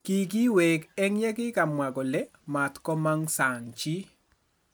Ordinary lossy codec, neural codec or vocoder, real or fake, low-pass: none; none; real; none